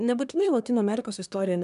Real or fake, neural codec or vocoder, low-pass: fake; codec, 24 kHz, 1 kbps, SNAC; 10.8 kHz